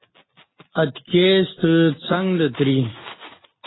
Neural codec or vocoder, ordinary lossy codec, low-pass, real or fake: none; AAC, 16 kbps; 7.2 kHz; real